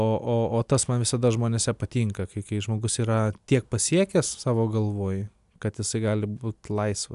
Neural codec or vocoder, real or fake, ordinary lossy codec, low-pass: none; real; AAC, 96 kbps; 10.8 kHz